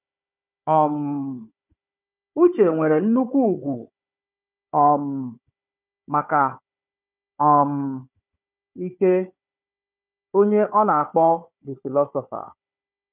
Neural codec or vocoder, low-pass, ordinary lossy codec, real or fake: codec, 16 kHz, 4 kbps, FunCodec, trained on Chinese and English, 50 frames a second; 3.6 kHz; none; fake